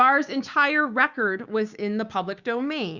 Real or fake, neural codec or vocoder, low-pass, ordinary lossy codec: fake; codec, 16 kHz, 6 kbps, DAC; 7.2 kHz; Opus, 64 kbps